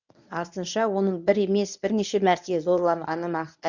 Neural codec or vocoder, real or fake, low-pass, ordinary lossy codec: codec, 24 kHz, 0.9 kbps, WavTokenizer, medium speech release version 1; fake; 7.2 kHz; none